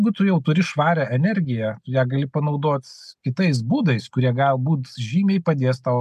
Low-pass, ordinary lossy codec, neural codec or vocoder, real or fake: 14.4 kHz; MP3, 96 kbps; none; real